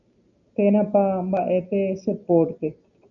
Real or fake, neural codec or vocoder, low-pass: real; none; 7.2 kHz